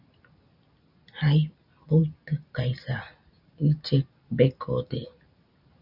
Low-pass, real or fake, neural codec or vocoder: 5.4 kHz; real; none